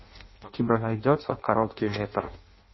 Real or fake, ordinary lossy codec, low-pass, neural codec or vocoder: fake; MP3, 24 kbps; 7.2 kHz; codec, 16 kHz in and 24 kHz out, 0.6 kbps, FireRedTTS-2 codec